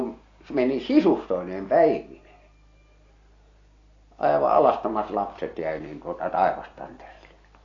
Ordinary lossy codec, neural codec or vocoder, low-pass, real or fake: AAC, 32 kbps; none; 7.2 kHz; real